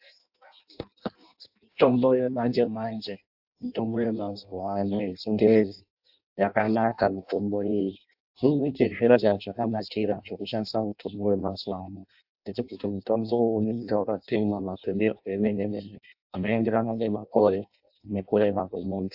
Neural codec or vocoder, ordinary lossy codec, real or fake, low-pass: codec, 16 kHz in and 24 kHz out, 0.6 kbps, FireRedTTS-2 codec; AAC, 48 kbps; fake; 5.4 kHz